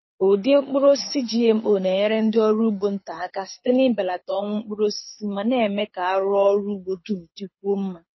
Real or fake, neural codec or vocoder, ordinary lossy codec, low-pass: fake; codec, 24 kHz, 6 kbps, HILCodec; MP3, 24 kbps; 7.2 kHz